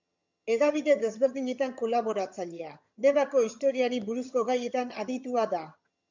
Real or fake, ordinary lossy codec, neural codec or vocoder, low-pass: fake; MP3, 64 kbps; vocoder, 22.05 kHz, 80 mel bands, HiFi-GAN; 7.2 kHz